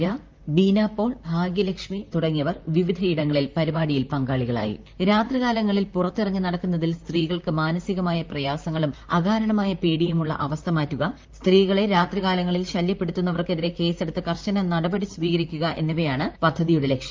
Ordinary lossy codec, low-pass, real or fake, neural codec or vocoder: Opus, 24 kbps; 7.2 kHz; fake; vocoder, 44.1 kHz, 128 mel bands, Pupu-Vocoder